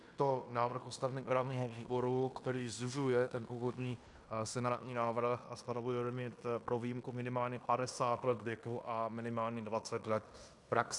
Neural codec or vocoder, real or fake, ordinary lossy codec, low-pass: codec, 16 kHz in and 24 kHz out, 0.9 kbps, LongCat-Audio-Codec, fine tuned four codebook decoder; fake; AAC, 64 kbps; 10.8 kHz